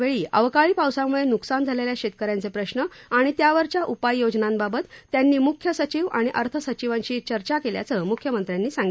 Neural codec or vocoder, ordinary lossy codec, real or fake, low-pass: none; none; real; none